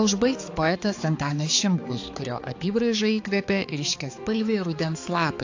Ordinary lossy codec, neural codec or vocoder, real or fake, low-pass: AAC, 48 kbps; codec, 16 kHz, 4 kbps, X-Codec, HuBERT features, trained on balanced general audio; fake; 7.2 kHz